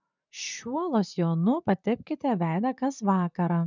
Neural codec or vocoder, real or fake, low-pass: vocoder, 44.1 kHz, 128 mel bands, Pupu-Vocoder; fake; 7.2 kHz